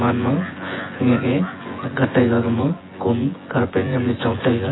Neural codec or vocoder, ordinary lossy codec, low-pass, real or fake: vocoder, 24 kHz, 100 mel bands, Vocos; AAC, 16 kbps; 7.2 kHz; fake